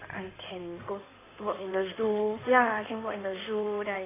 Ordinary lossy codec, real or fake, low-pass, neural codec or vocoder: AAC, 16 kbps; fake; 3.6 kHz; codec, 16 kHz in and 24 kHz out, 2.2 kbps, FireRedTTS-2 codec